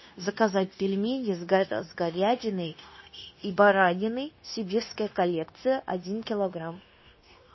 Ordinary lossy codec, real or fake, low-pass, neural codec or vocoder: MP3, 24 kbps; fake; 7.2 kHz; codec, 16 kHz, 0.9 kbps, LongCat-Audio-Codec